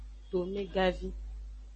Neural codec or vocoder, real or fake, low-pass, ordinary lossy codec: none; real; 9.9 kHz; MP3, 32 kbps